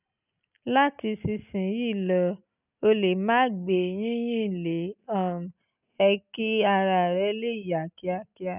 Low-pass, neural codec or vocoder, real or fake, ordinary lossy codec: 3.6 kHz; none; real; none